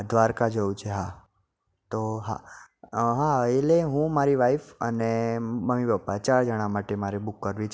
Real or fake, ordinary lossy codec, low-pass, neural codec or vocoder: real; none; none; none